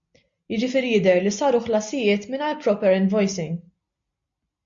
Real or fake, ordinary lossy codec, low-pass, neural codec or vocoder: real; MP3, 48 kbps; 7.2 kHz; none